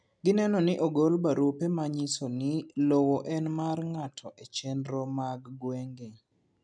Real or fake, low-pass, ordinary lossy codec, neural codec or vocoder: real; 9.9 kHz; none; none